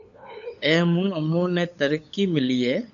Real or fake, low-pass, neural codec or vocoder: fake; 7.2 kHz; codec, 16 kHz, 8 kbps, FunCodec, trained on LibriTTS, 25 frames a second